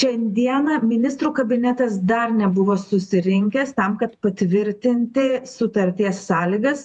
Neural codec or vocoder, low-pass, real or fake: vocoder, 48 kHz, 128 mel bands, Vocos; 10.8 kHz; fake